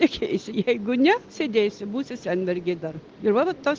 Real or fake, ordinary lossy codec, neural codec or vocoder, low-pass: real; Opus, 16 kbps; none; 7.2 kHz